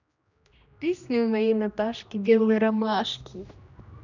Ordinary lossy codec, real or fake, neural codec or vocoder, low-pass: none; fake; codec, 16 kHz, 1 kbps, X-Codec, HuBERT features, trained on general audio; 7.2 kHz